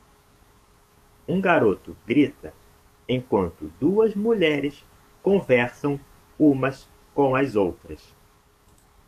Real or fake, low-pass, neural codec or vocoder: fake; 14.4 kHz; codec, 44.1 kHz, 7.8 kbps, DAC